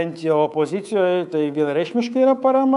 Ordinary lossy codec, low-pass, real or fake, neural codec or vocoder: MP3, 96 kbps; 10.8 kHz; fake; codec, 24 kHz, 3.1 kbps, DualCodec